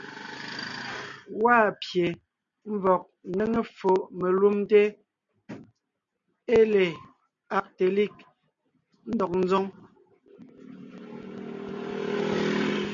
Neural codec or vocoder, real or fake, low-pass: none; real; 7.2 kHz